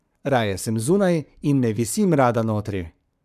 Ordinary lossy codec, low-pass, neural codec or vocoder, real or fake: none; 14.4 kHz; codec, 44.1 kHz, 7.8 kbps, Pupu-Codec; fake